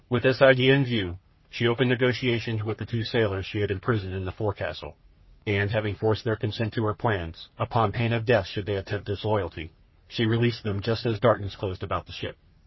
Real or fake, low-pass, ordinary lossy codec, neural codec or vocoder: fake; 7.2 kHz; MP3, 24 kbps; codec, 44.1 kHz, 3.4 kbps, Pupu-Codec